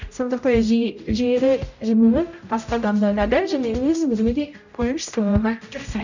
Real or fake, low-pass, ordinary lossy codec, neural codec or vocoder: fake; 7.2 kHz; none; codec, 16 kHz, 0.5 kbps, X-Codec, HuBERT features, trained on general audio